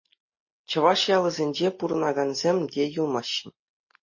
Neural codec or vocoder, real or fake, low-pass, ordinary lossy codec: none; real; 7.2 kHz; MP3, 32 kbps